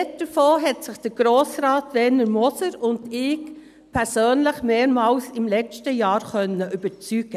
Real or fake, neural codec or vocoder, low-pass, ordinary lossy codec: real; none; 14.4 kHz; none